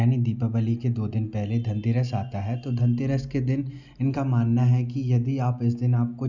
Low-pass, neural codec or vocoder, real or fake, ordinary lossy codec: 7.2 kHz; none; real; none